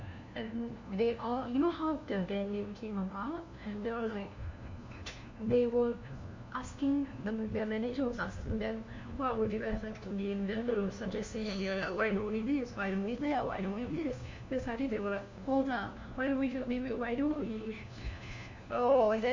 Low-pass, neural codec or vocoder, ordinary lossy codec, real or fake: 7.2 kHz; codec, 16 kHz, 1 kbps, FunCodec, trained on LibriTTS, 50 frames a second; MP3, 64 kbps; fake